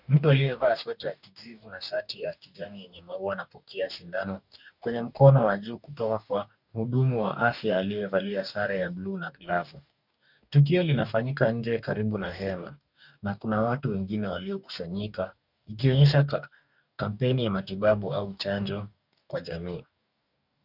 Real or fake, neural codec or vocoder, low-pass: fake; codec, 44.1 kHz, 2.6 kbps, DAC; 5.4 kHz